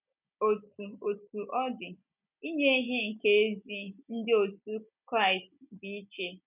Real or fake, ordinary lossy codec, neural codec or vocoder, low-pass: real; none; none; 3.6 kHz